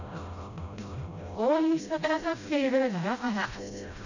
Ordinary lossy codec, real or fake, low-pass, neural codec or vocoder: none; fake; 7.2 kHz; codec, 16 kHz, 0.5 kbps, FreqCodec, smaller model